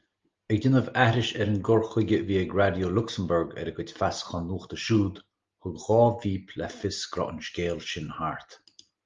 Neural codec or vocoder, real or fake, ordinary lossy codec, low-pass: none; real; Opus, 32 kbps; 7.2 kHz